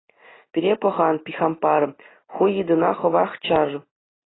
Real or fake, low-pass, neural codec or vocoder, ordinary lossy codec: real; 7.2 kHz; none; AAC, 16 kbps